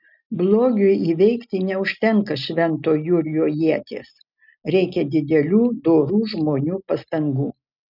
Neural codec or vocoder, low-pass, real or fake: none; 5.4 kHz; real